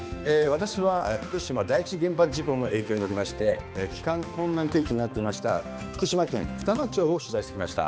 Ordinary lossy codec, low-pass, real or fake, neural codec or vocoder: none; none; fake; codec, 16 kHz, 2 kbps, X-Codec, HuBERT features, trained on balanced general audio